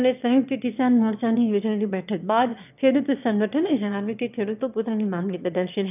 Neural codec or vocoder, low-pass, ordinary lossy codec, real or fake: autoencoder, 22.05 kHz, a latent of 192 numbers a frame, VITS, trained on one speaker; 3.6 kHz; none; fake